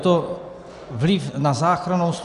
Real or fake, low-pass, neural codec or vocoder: real; 10.8 kHz; none